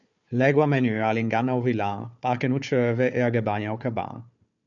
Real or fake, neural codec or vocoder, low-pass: fake; codec, 16 kHz, 4 kbps, FunCodec, trained on Chinese and English, 50 frames a second; 7.2 kHz